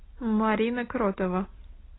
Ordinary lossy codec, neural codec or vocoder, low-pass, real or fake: AAC, 16 kbps; none; 7.2 kHz; real